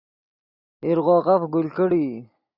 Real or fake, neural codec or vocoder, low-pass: real; none; 5.4 kHz